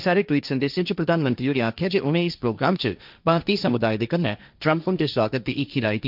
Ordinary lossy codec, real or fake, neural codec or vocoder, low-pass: none; fake; codec, 16 kHz, 1.1 kbps, Voila-Tokenizer; 5.4 kHz